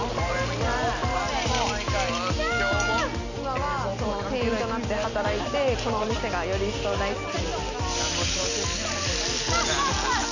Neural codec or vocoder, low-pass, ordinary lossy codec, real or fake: none; 7.2 kHz; none; real